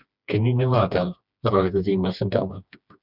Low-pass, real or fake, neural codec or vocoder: 5.4 kHz; fake; codec, 16 kHz, 2 kbps, FreqCodec, smaller model